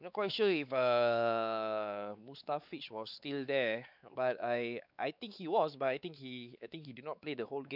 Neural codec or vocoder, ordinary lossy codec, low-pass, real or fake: codec, 16 kHz, 4 kbps, X-Codec, WavLM features, trained on Multilingual LibriSpeech; none; 5.4 kHz; fake